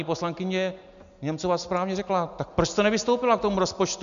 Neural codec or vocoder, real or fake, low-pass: none; real; 7.2 kHz